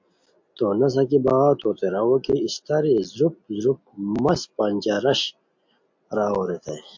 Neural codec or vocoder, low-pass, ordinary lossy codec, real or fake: none; 7.2 kHz; MP3, 48 kbps; real